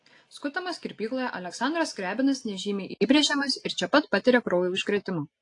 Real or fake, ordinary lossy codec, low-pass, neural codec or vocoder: real; AAC, 48 kbps; 10.8 kHz; none